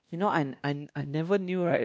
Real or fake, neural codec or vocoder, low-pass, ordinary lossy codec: fake; codec, 16 kHz, 1 kbps, X-Codec, WavLM features, trained on Multilingual LibriSpeech; none; none